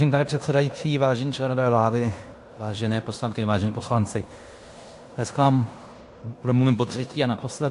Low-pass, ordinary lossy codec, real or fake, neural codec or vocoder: 10.8 kHz; AAC, 64 kbps; fake; codec, 16 kHz in and 24 kHz out, 0.9 kbps, LongCat-Audio-Codec, fine tuned four codebook decoder